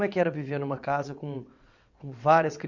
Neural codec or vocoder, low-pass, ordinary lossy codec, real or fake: vocoder, 22.05 kHz, 80 mel bands, WaveNeXt; 7.2 kHz; none; fake